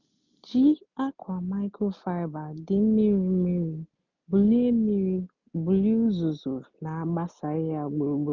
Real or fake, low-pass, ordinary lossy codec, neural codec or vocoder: real; 7.2 kHz; none; none